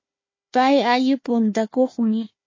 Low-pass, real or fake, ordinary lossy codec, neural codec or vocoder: 7.2 kHz; fake; MP3, 32 kbps; codec, 16 kHz, 1 kbps, FunCodec, trained on Chinese and English, 50 frames a second